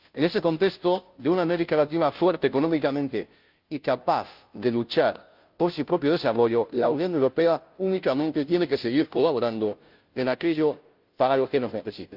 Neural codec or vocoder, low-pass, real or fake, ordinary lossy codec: codec, 16 kHz, 0.5 kbps, FunCodec, trained on Chinese and English, 25 frames a second; 5.4 kHz; fake; Opus, 24 kbps